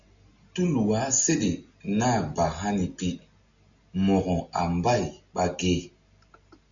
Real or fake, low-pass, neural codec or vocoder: real; 7.2 kHz; none